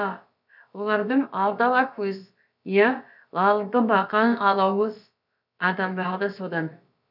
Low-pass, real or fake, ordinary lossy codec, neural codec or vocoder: 5.4 kHz; fake; none; codec, 16 kHz, about 1 kbps, DyCAST, with the encoder's durations